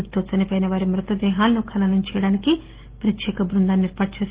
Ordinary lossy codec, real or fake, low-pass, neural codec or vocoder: Opus, 16 kbps; real; 3.6 kHz; none